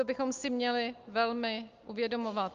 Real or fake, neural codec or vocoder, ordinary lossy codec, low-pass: real; none; Opus, 32 kbps; 7.2 kHz